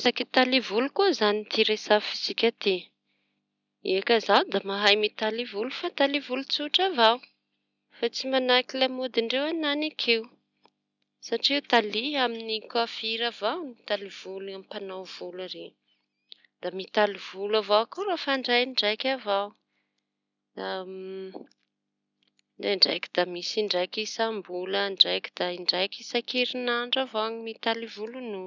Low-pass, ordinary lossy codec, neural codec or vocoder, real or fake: 7.2 kHz; none; none; real